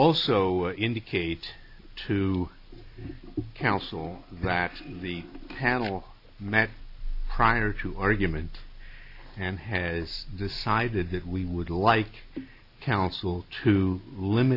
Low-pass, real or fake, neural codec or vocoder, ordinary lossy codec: 5.4 kHz; real; none; AAC, 48 kbps